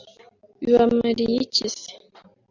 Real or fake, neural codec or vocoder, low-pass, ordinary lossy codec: real; none; 7.2 kHz; AAC, 48 kbps